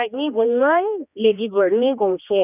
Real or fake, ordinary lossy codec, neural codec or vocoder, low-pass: fake; none; codec, 16 kHz, 2 kbps, X-Codec, HuBERT features, trained on general audio; 3.6 kHz